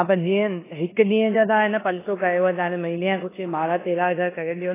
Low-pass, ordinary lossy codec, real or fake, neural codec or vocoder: 3.6 kHz; AAC, 16 kbps; fake; codec, 16 kHz in and 24 kHz out, 0.9 kbps, LongCat-Audio-Codec, four codebook decoder